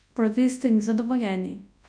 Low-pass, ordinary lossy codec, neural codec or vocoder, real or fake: 9.9 kHz; none; codec, 24 kHz, 0.9 kbps, WavTokenizer, large speech release; fake